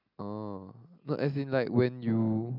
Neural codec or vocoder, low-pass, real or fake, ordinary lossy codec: none; 5.4 kHz; real; none